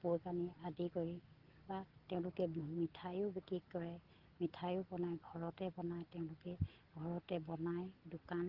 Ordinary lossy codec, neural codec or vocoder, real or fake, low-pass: Opus, 16 kbps; none; real; 5.4 kHz